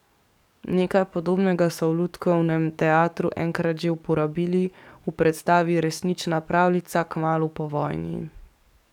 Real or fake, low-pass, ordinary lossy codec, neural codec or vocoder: fake; 19.8 kHz; none; codec, 44.1 kHz, 7.8 kbps, DAC